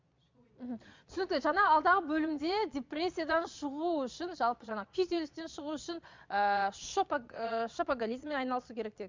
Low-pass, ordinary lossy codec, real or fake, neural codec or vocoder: 7.2 kHz; MP3, 64 kbps; fake; vocoder, 22.05 kHz, 80 mel bands, Vocos